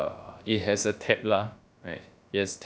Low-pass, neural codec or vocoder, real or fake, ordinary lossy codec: none; codec, 16 kHz, about 1 kbps, DyCAST, with the encoder's durations; fake; none